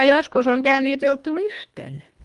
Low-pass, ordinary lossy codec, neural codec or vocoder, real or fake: 10.8 kHz; Opus, 32 kbps; codec, 24 kHz, 1.5 kbps, HILCodec; fake